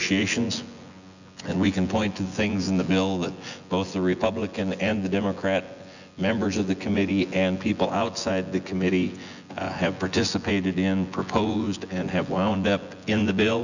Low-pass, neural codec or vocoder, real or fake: 7.2 kHz; vocoder, 24 kHz, 100 mel bands, Vocos; fake